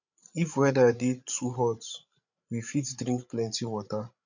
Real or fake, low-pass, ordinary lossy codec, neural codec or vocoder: fake; 7.2 kHz; none; codec, 16 kHz, 8 kbps, FreqCodec, larger model